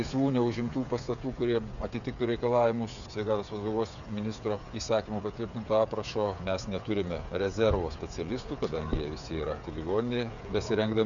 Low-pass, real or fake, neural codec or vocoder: 7.2 kHz; fake; codec, 16 kHz, 8 kbps, FreqCodec, smaller model